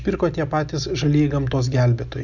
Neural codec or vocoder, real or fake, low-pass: none; real; 7.2 kHz